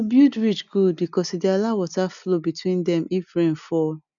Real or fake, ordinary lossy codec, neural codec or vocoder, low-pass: real; none; none; 7.2 kHz